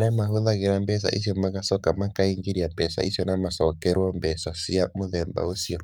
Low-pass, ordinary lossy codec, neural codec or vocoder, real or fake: 19.8 kHz; none; codec, 44.1 kHz, 7.8 kbps, Pupu-Codec; fake